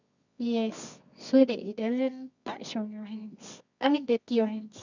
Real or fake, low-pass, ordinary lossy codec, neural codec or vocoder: fake; 7.2 kHz; none; codec, 24 kHz, 0.9 kbps, WavTokenizer, medium music audio release